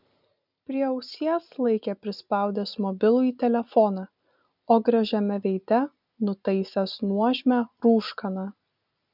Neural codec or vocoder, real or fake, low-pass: none; real; 5.4 kHz